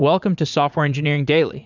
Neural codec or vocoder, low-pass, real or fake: vocoder, 44.1 kHz, 80 mel bands, Vocos; 7.2 kHz; fake